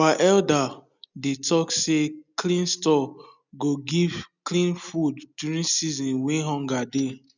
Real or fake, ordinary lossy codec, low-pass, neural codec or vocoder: real; none; 7.2 kHz; none